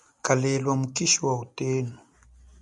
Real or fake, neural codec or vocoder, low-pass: real; none; 10.8 kHz